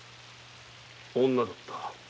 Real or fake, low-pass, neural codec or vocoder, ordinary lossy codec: real; none; none; none